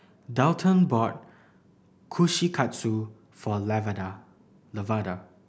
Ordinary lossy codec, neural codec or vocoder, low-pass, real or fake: none; none; none; real